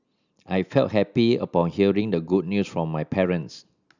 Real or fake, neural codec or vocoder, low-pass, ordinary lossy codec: real; none; 7.2 kHz; none